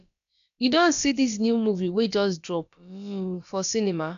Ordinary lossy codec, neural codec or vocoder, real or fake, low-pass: none; codec, 16 kHz, about 1 kbps, DyCAST, with the encoder's durations; fake; 7.2 kHz